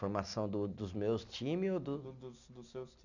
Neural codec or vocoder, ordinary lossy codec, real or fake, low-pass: none; none; real; 7.2 kHz